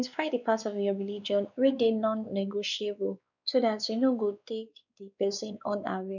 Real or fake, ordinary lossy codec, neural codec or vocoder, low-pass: fake; none; codec, 16 kHz, 2 kbps, X-Codec, WavLM features, trained on Multilingual LibriSpeech; 7.2 kHz